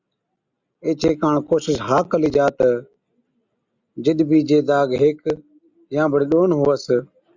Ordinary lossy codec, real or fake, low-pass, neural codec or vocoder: Opus, 64 kbps; real; 7.2 kHz; none